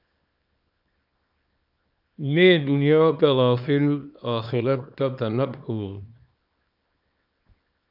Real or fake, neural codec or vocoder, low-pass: fake; codec, 24 kHz, 0.9 kbps, WavTokenizer, small release; 5.4 kHz